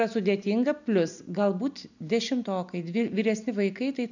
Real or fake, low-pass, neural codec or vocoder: real; 7.2 kHz; none